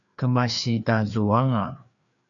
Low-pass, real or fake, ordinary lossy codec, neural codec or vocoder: 7.2 kHz; fake; MP3, 96 kbps; codec, 16 kHz, 2 kbps, FreqCodec, larger model